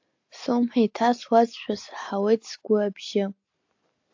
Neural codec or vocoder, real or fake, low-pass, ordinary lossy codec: none; real; 7.2 kHz; AAC, 48 kbps